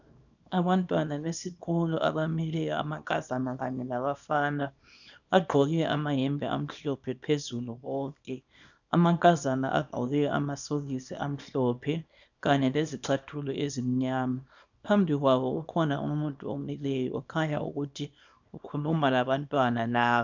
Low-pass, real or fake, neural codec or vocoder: 7.2 kHz; fake; codec, 24 kHz, 0.9 kbps, WavTokenizer, small release